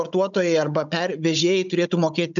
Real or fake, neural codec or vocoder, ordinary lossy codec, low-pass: fake; codec, 16 kHz, 16 kbps, FunCodec, trained on Chinese and English, 50 frames a second; MP3, 96 kbps; 7.2 kHz